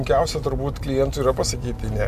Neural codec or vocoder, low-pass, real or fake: none; 14.4 kHz; real